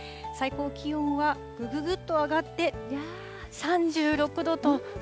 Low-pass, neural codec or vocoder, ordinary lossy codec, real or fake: none; none; none; real